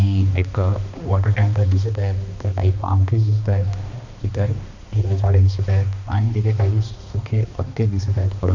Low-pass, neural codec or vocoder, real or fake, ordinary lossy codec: 7.2 kHz; codec, 16 kHz, 2 kbps, X-Codec, HuBERT features, trained on balanced general audio; fake; none